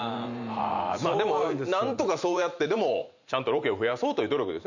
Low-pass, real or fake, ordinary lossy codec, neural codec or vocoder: 7.2 kHz; fake; none; vocoder, 44.1 kHz, 128 mel bands every 512 samples, BigVGAN v2